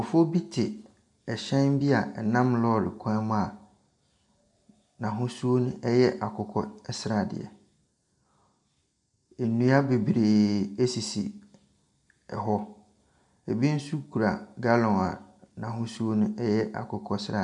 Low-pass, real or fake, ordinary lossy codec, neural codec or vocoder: 10.8 kHz; real; MP3, 96 kbps; none